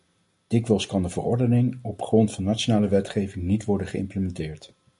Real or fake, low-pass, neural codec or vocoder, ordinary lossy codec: real; 10.8 kHz; none; MP3, 48 kbps